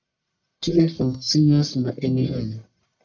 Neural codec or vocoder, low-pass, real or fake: codec, 44.1 kHz, 1.7 kbps, Pupu-Codec; 7.2 kHz; fake